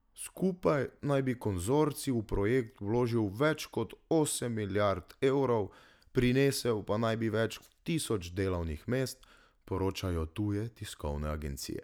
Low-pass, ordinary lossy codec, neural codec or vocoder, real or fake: 19.8 kHz; none; none; real